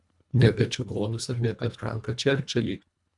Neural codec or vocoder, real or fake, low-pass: codec, 24 kHz, 1.5 kbps, HILCodec; fake; 10.8 kHz